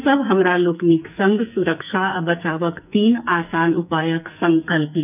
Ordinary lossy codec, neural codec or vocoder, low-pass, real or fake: none; codec, 44.1 kHz, 2.6 kbps, SNAC; 3.6 kHz; fake